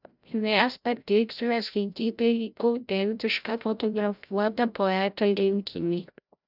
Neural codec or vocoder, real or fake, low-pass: codec, 16 kHz, 0.5 kbps, FreqCodec, larger model; fake; 5.4 kHz